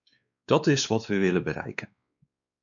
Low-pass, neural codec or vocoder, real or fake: 7.2 kHz; codec, 16 kHz, 2 kbps, X-Codec, WavLM features, trained on Multilingual LibriSpeech; fake